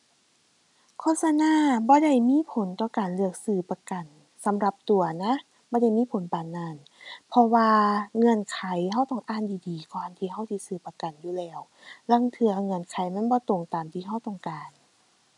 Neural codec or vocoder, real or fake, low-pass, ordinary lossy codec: none; real; 10.8 kHz; none